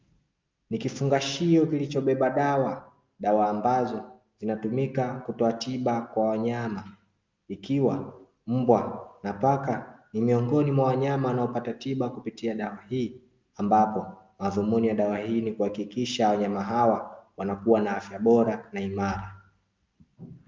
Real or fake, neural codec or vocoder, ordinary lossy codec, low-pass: real; none; Opus, 24 kbps; 7.2 kHz